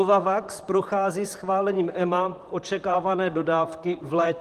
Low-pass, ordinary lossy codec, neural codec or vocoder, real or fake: 14.4 kHz; Opus, 24 kbps; vocoder, 44.1 kHz, 128 mel bands, Pupu-Vocoder; fake